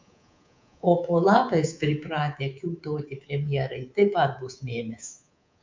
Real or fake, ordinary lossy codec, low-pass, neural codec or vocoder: fake; MP3, 64 kbps; 7.2 kHz; codec, 24 kHz, 3.1 kbps, DualCodec